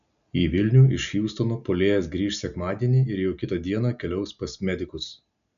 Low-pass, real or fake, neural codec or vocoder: 7.2 kHz; real; none